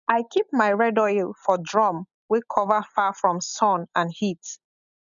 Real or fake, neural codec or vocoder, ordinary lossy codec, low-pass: real; none; none; 7.2 kHz